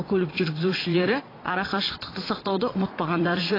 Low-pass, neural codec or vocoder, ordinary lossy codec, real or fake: 5.4 kHz; vocoder, 22.05 kHz, 80 mel bands, Vocos; AAC, 24 kbps; fake